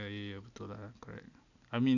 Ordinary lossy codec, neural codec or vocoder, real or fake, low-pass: none; none; real; 7.2 kHz